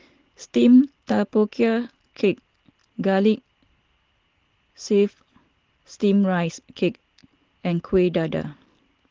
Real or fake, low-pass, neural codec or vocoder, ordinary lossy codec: real; 7.2 kHz; none; Opus, 16 kbps